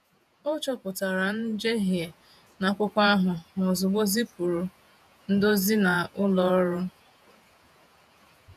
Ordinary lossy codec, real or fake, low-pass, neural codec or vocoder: none; fake; 14.4 kHz; vocoder, 48 kHz, 128 mel bands, Vocos